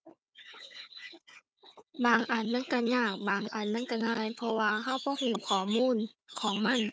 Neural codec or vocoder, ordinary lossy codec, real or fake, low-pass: codec, 16 kHz, 4 kbps, FunCodec, trained on Chinese and English, 50 frames a second; none; fake; none